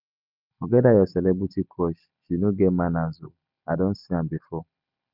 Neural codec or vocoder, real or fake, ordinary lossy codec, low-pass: none; real; none; 5.4 kHz